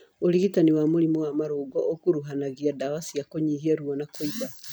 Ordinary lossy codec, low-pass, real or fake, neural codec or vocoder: none; none; real; none